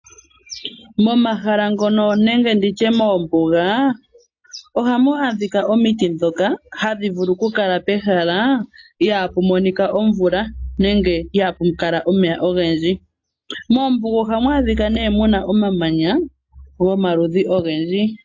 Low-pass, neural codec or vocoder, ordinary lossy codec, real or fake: 7.2 kHz; none; AAC, 48 kbps; real